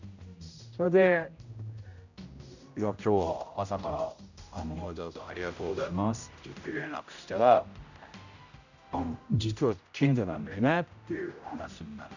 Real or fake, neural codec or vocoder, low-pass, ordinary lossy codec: fake; codec, 16 kHz, 0.5 kbps, X-Codec, HuBERT features, trained on general audio; 7.2 kHz; none